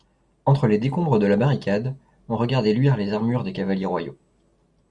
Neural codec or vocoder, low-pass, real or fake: none; 10.8 kHz; real